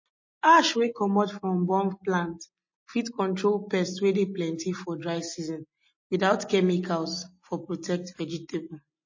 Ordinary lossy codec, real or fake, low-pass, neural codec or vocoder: MP3, 32 kbps; real; 7.2 kHz; none